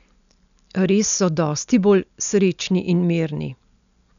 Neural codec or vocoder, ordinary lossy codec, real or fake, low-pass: none; none; real; 7.2 kHz